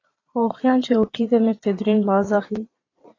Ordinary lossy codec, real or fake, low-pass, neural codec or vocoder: AAC, 32 kbps; fake; 7.2 kHz; vocoder, 22.05 kHz, 80 mel bands, Vocos